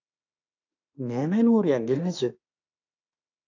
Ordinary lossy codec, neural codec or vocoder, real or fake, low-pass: AAC, 48 kbps; autoencoder, 48 kHz, 32 numbers a frame, DAC-VAE, trained on Japanese speech; fake; 7.2 kHz